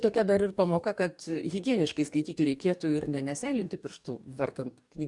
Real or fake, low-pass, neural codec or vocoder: fake; 10.8 kHz; codec, 44.1 kHz, 2.6 kbps, DAC